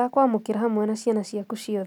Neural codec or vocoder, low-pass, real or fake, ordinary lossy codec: none; 19.8 kHz; real; none